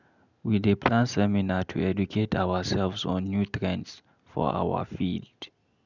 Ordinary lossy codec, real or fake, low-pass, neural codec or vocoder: none; real; 7.2 kHz; none